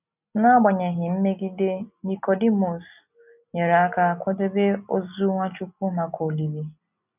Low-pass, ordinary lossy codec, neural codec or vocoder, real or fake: 3.6 kHz; none; none; real